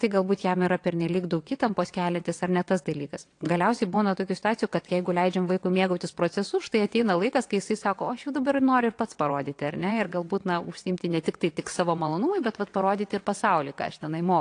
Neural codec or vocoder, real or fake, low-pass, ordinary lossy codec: vocoder, 22.05 kHz, 80 mel bands, WaveNeXt; fake; 9.9 kHz; AAC, 48 kbps